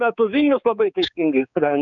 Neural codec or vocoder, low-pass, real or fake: codec, 16 kHz, 4 kbps, X-Codec, HuBERT features, trained on balanced general audio; 7.2 kHz; fake